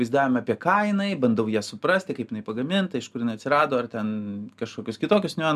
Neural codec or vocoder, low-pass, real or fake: none; 14.4 kHz; real